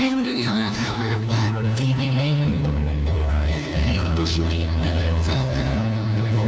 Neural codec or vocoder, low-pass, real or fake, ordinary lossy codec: codec, 16 kHz, 1 kbps, FunCodec, trained on LibriTTS, 50 frames a second; none; fake; none